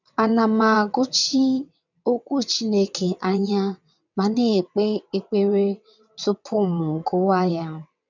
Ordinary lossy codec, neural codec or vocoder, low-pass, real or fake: none; vocoder, 22.05 kHz, 80 mel bands, WaveNeXt; 7.2 kHz; fake